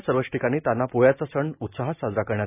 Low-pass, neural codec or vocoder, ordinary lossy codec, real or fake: 3.6 kHz; none; none; real